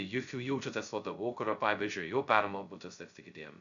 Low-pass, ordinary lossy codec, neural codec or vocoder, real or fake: 7.2 kHz; MP3, 96 kbps; codec, 16 kHz, 0.2 kbps, FocalCodec; fake